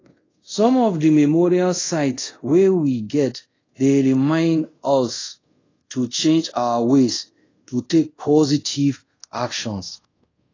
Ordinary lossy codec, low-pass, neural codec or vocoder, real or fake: AAC, 32 kbps; 7.2 kHz; codec, 24 kHz, 0.5 kbps, DualCodec; fake